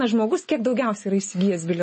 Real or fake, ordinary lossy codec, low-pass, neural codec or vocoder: real; MP3, 32 kbps; 10.8 kHz; none